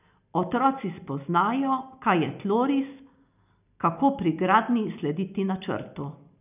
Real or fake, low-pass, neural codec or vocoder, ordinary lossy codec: real; 3.6 kHz; none; none